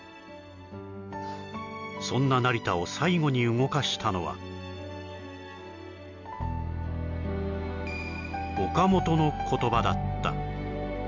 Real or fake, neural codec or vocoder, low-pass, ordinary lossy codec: real; none; 7.2 kHz; none